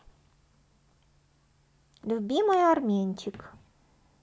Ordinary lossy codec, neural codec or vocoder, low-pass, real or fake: none; none; none; real